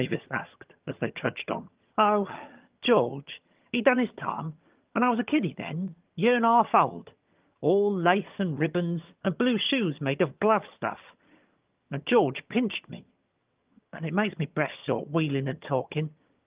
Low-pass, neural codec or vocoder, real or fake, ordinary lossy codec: 3.6 kHz; vocoder, 22.05 kHz, 80 mel bands, HiFi-GAN; fake; Opus, 32 kbps